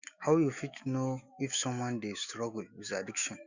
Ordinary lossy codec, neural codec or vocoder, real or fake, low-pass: Opus, 64 kbps; autoencoder, 48 kHz, 128 numbers a frame, DAC-VAE, trained on Japanese speech; fake; 7.2 kHz